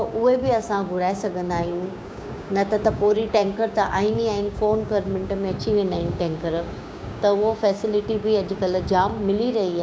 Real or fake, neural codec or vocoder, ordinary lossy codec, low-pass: fake; codec, 16 kHz, 6 kbps, DAC; none; none